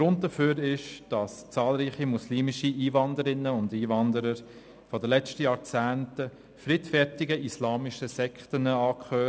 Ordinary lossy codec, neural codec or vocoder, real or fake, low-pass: none; none; real; none